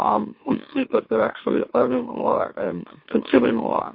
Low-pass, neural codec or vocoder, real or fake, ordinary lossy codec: 5.4 kHz; autoencoder, 44.1 kHz, a latent of 192 numbers a frame, MeloTTS; fake; MP3, 32 kbps